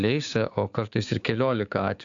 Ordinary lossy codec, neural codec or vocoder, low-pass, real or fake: AAC, 64 kbps; codec, 16 kHz, 6 kbps, DAC; 7.2 kHz; fake